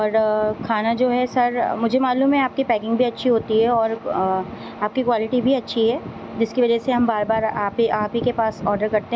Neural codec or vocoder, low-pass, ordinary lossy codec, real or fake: none; none; none; real